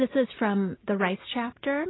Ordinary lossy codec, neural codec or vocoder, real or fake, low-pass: AAC, 16 kbps; none; real; 7.2 kHz